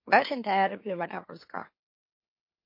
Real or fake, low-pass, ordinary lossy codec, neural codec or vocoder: fake; 5.4 kHz; MP3, 32 kbps; autoencoder, 44.1 kHz, a latent of 192 numbers a frame, MeloTTS